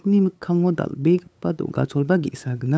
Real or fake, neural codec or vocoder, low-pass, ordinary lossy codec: fake; codec, 16 kHz, 8 kbps, FunCodec, trained on LibriTTS, 25 frames a second; none; none